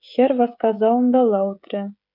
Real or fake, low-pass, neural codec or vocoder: fake; 5.4 kHz; codec, 16 kHz, 16 kbps, FreqCodec, smaller model